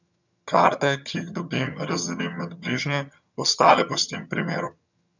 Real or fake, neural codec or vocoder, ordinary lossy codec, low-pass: fake; vocoder, 22.05 kHz, 80 mel bands, HiFi-GAN; none; 7.2 kHz